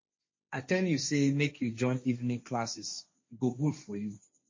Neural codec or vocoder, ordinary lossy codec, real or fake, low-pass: codec, 16 kHz, 1.1 kbps, Voila-Tokenizer; MP3, 32 kbps; fake; 7.2 kHz